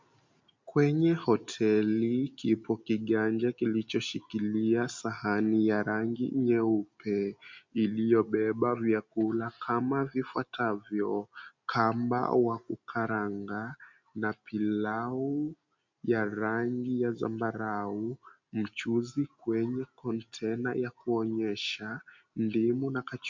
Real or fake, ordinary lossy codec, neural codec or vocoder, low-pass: real; MP3, 64 kbps; none; 7.2 kHz